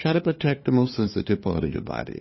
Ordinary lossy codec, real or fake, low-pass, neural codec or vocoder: MP3, 24 kbps; fake; 7.2 kHz; codec, 16 kHz, 2 kbps, FunCodec, trained on LibriTTS, 25 frames a second